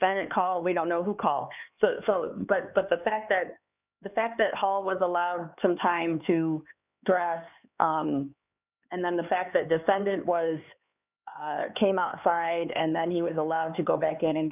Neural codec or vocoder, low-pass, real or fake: codec, 16 kHz, 4 kbps, X-Codec, HuBERT features, trained on LibriSpeech; 3.6 kHz; fake